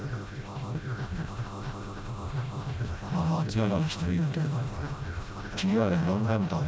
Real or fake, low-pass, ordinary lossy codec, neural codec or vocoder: fake; none; none; codec, 16 kHz, 0.5 kbps, FreqCodec, smaller model